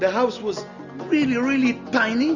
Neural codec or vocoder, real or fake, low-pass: none; real; 7.2 kHz